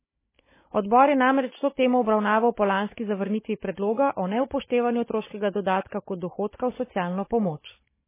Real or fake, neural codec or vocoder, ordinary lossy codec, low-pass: fake; codec, 16 kHz, 16 kbps, FunCodec, trained on Chinese and English, 50 frames a second; MP3, 16 kbps; 3.6 kHz